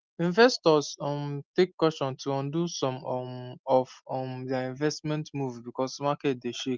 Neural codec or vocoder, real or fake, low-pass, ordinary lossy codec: none; real; 7.2 kHz; Opus, 24 kbps